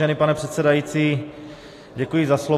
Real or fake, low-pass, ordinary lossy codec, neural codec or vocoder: real; 14.4 kHz; AAC, 48 kbps; none